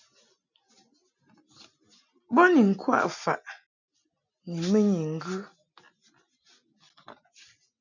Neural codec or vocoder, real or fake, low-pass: none; real; 7.2 kHz